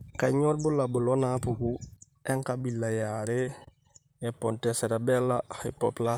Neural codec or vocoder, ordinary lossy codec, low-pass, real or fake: vocoder, 44.1 kHz, 128 mel bands, Pupu-Vocoder; none; none; fake